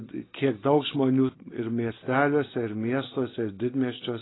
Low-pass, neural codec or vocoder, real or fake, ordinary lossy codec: 7.2 kHz; none; real; AAC, 16 kbps